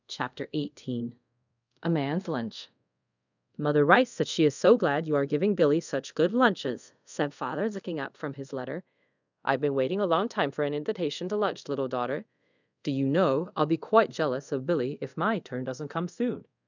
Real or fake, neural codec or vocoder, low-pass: fake; codec, 24 kHz, 0.5 kbps, DualCodec; 7.2 kHz